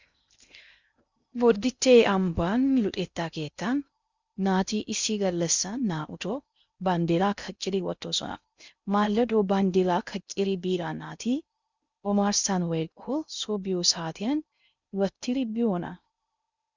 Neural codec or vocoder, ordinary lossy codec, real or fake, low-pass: codec, 16 kHz in and 24 kHz out, 0.6 kbps, FocalCodec, streaming, 2048 codes; Opus, 64 kbps; fake; 7.2 kHz